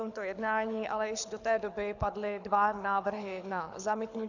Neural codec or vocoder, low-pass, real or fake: codec, 24 kHz, 6 kbps, HILCodec; 7.2 kHz; fake